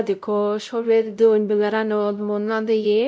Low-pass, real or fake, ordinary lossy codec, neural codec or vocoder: none; fake; none; codec, 16 kHz, 0.5 kbps, X-Codec, WavLM features, trained on Multilingual LibriSpeech